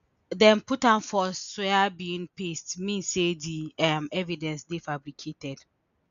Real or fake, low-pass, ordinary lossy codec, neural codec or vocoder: real; 7.2 kHz; none; none